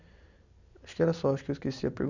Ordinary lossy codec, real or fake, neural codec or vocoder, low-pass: none; real; none; 7.2 kHz